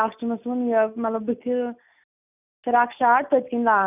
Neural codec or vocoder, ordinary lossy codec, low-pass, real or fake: none; none; 3.6 kHz; real